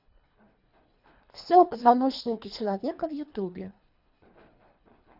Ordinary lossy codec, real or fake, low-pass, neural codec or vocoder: none; fake; 5.4 kHz; codec, 24 kHz, 3 kbps, HILCodec